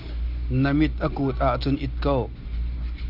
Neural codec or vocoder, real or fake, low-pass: none; real; 5.4 kHz